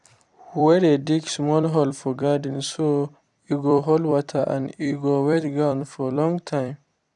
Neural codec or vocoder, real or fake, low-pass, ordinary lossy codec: vocoder, 44.1 kHz, 128 mel bands every 256 samples, BigVGAN v2; fake; 10.8 kHz; none